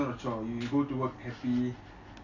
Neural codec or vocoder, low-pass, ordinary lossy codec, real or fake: none; 7.2 kHz; none; real